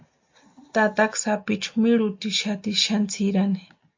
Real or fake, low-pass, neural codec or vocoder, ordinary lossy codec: real; 7.2 kHz; none; MP3, 48 kbps